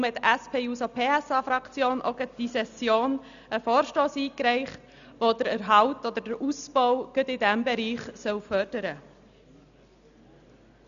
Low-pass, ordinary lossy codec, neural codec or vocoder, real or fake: 7.2 kHz; none; none; real